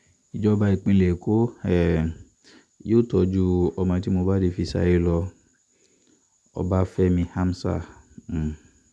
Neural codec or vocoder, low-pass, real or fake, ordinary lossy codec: none; none; real; none